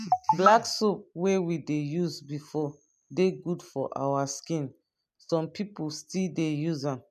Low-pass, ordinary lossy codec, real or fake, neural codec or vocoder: 14.4 kHz; none; real; none